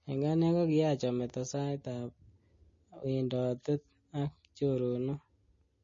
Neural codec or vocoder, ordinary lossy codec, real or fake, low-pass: none; MP3, 32 kbps; real; 7.2 kHz